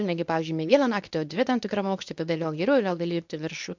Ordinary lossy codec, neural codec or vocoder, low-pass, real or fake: MP3, 64 kbps; codec, 24 kHz, 0.9 kbps, WavTokenizer, medium speech release version 2; 7.2 kHz; fake